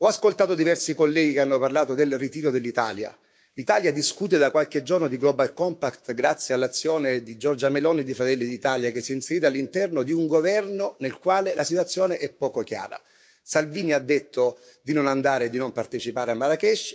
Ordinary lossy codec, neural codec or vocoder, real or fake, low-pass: none; codec, 16 kHz, 6 kbps, DAC; fake; none